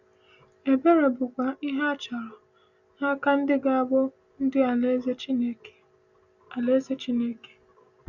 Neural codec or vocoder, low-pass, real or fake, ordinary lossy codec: none; 7.2 kHz; real; none